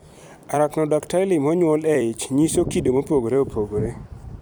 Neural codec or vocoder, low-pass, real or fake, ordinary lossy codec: vocoder, 44.1 kHz, 128 mel bands every 256 samples, BigVGAN v2; none; fake; none